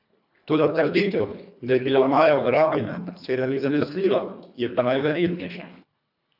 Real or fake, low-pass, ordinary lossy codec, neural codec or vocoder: fake; 5.4 kHz; none; codec, 24 kHz, 1.5 kbps, HILCodec